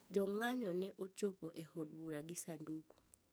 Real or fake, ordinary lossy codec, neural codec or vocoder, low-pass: fake; none; codec, 44.1 kHz, 2.6 kbps, SNAC; none